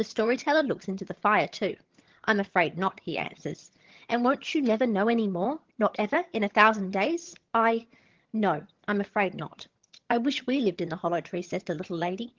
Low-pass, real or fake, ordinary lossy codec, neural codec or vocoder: 7.2 kHz; fake; Opus, 16 kbps; vocoder, 22.05 kHz, 80 mel bands, HiFi-GAN